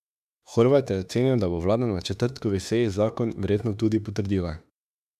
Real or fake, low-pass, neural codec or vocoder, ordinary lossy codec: fake; 14.4 kHz; autoencoder, 48 kHz, 32 numbers a frame, DAC-VAE, trained on Japanese speech; none